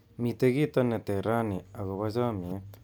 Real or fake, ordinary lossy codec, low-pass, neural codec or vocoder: real; none; none; none